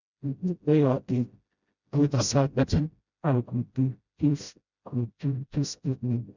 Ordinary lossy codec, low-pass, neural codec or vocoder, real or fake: none; 7.2 kHz; codec, 16 kHz, 0.5 kbps, FreqCodec, smaller model; fake